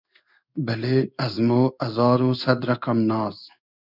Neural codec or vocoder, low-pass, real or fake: codec, 16 kHz in and 24 kHz out, 1 kbps, XY-Tokenizer; 5.4 kHz; fake